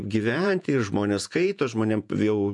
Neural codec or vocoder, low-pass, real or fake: vocoder, 48 kHz, 128 mel bands, Vocos; 10.8 kHz; fake